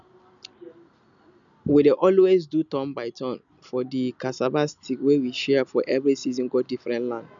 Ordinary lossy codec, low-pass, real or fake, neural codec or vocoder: none; 7.2 kHz; real; none